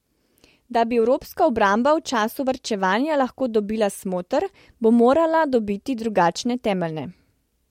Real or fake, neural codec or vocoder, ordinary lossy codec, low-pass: real; none; MP3, 64 kbps; 19.8 kHz